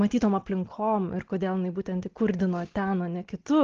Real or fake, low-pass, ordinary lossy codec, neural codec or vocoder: real; 7.2 kHz; Opus, 16 kbps; none